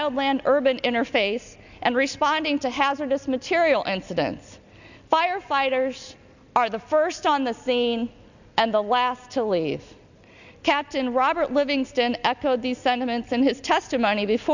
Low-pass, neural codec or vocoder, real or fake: 7.2 kHz; none; real